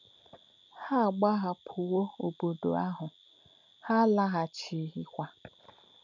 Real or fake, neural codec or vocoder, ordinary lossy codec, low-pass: real; none; none; 7.2 kHz